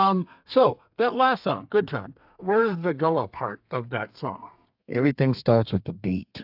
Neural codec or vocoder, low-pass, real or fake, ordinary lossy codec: codec, 32 kHz, 1.9 kbps, SNAC; 5.4 kHz; fake; AAC, 48 kbps